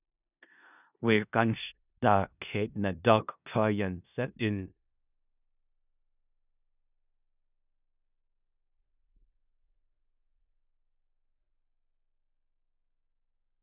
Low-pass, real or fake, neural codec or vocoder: 3.6 kHz; fake; codec, 16 kHz in and 24 kHz out, 0.4 kbps, LongCat-Audio-Codec, four codebook decoder